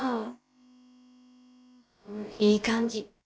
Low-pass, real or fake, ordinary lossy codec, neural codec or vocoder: none; fake; none; codec, 16 kHz, about 1 kbps, DyCAST, with the encoder's durations